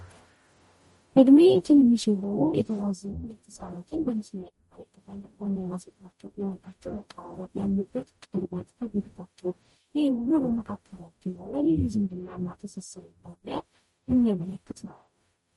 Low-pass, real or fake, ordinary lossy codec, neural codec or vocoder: 19.8 kHz; fake; MP3, 48 kbps; codec, 44.1 kHz, 0.9 kbps, DAC